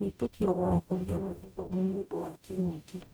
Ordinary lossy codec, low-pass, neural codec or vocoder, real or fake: none; none; codec, 44.1 kHz, 0.9 kbps, DAC; fake